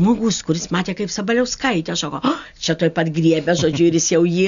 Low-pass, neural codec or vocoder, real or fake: 7.2 kHz; none; real